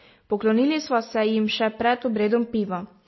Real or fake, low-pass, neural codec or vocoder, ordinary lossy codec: real; 7.2 kHz; none; MP3, 24 kbps